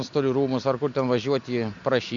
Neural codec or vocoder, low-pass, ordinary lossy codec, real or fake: none; 7.2 kHz; MP3, 64 kbps; real